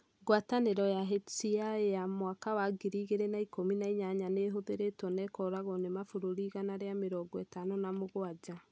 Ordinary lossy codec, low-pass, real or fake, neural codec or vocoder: none; none; real; none